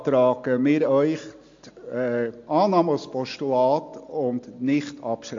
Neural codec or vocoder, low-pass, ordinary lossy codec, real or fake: none; 7.2 kHz; MP3, 48 kbps; real